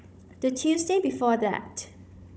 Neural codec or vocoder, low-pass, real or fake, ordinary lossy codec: codec, 16 kHz, 16 kbps, FunCodec, trained on Chinese and English, 50 frames a second; none; fake; none